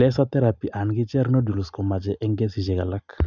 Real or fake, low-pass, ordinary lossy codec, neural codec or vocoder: real; 7.2 kHz; none; none